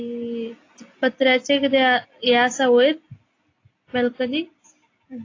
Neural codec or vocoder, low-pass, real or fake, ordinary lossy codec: none; 7.2 kHz; real; AAC, 32 kbps